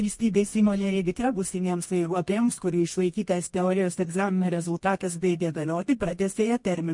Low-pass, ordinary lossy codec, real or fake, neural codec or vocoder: 10.8 kHz; MP3, 48 kbps; fake; codec, 24 kHz, 0.9 kbps, WavTokenizer, medium music audio release